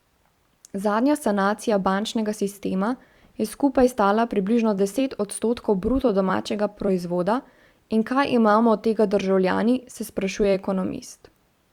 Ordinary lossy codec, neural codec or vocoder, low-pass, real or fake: Opus, 64 kbps; vocoder, 44.1 kHz, 128 mel bands every 256 samples, BigVGAN v2; 19.8 kHz; fake